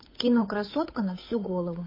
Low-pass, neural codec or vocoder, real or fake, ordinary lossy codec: 5.4 kHz; codec, 16 kHz, 8 kbps, FunCodec, trained on Chinese and English, 25 frames a second; fake; MP3, 24 kbps